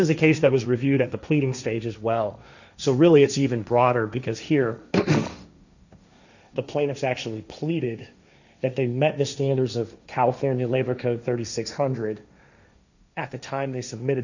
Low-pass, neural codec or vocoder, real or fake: 7.2 kHz; codec, 16 kHz, 1.1 kbps, Voila-Tokenizer; fake